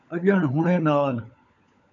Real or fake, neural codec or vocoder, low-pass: fake; codec, 16 kHz, 16 kbps, FunCodec, trained on LibriTTS, 50 frames a second; 7.2 kHz